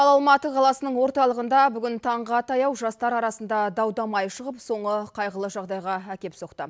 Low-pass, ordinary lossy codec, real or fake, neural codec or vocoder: none; none; real; none